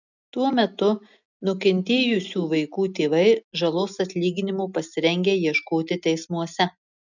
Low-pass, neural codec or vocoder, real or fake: 7.2 kHz; none; real